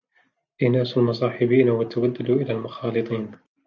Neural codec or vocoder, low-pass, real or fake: none; 7.2 kHz; real